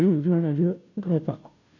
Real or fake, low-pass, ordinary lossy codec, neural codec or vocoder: fake; 7.2 kHz; none; codec, 16 kHz, 0.5 kbps, FunCodec, trained on Chinese and English, 25 frames a second